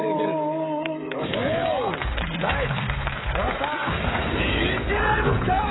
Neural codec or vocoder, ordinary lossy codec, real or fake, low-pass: codec, 16 kHz, 16 kbps, FreqCodec, smaller model; AAC, 16 kbps; fake; 7.2 kHz